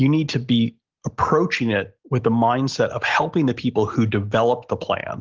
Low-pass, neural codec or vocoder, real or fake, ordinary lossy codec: 7.2 kHz; none; real; Opus, 16 kbps